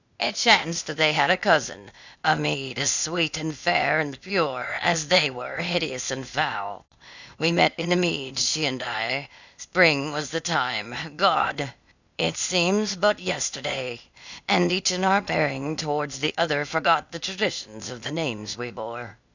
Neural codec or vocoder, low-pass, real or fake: codec, 16 kHz, 0.8 kbps, ZipCodec; 7.2 kHz; fake